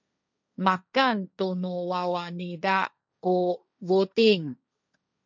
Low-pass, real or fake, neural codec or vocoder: 7.2 kHz; fake; codec, 16 kHz, 1.1 kbps, Voila-Tokenizer